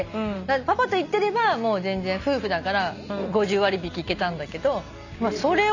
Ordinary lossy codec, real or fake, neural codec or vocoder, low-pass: none; real; none; 7.2 kHz